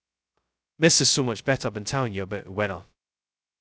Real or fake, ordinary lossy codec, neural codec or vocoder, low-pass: fake; none; codec, 16 kHz, 0.2 kbps, FocalCodec; none